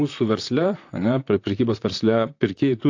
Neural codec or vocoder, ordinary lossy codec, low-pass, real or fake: vocoder, 44.1 kHz, 128 mel bands, Pupu-Vocoder; AAC, 48 kbps; 7.2 kHz; fake